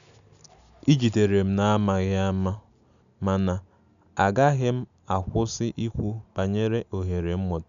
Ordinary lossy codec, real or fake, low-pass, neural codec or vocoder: none; real; 7.2 kHz; none